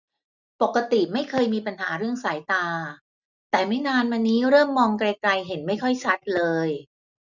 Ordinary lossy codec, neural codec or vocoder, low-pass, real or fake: none; none; 7.2 kHz; real